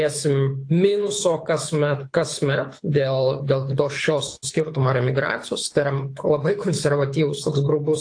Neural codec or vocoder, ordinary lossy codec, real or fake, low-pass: codec, 24 kHz, 6 kbps, HILCodec; AAC, 48 kbps; fake; 9.9 kHz